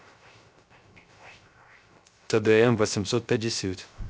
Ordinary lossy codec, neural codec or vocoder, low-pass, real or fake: none; codec, 16 kHz, 0.3 kbps, FocalCodec; none; fake